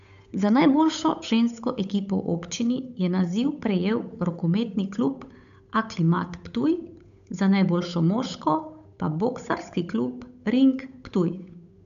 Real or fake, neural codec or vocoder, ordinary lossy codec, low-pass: fake; codec, 16 kHz, 8 kbps, FunCodec, trained on Chinese and English, 25 frames a second; none; 7.2 kHz